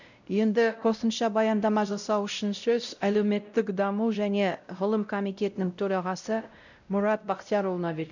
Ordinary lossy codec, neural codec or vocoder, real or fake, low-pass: none; codec, 16 kHz, 0.5 kbps, X-Codec, WavLM features, trained on Multilingual LibriSpeech; fake; 7.2 kHz